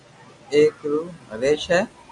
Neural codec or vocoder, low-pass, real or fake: none; 10.8 kHz; real